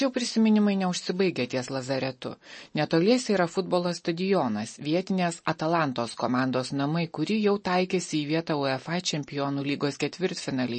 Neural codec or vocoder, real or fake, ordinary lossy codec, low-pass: none; real; MP3, 32 kbps; 10.8 kHz